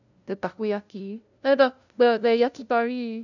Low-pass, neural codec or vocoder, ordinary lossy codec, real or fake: 7.2 kHz; codec, 16 kHz, 0.5 kbps, FunCodec, trained on LibriTTS, 25 frames a second; none; fake